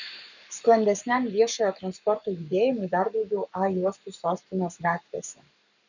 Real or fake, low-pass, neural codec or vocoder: fake; 7.2 kHz; codec, 44.1 kHz, 7.8 kbps, Pupu-Codec